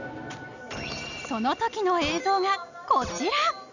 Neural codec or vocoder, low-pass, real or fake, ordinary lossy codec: none; 7.2 kHz; real; none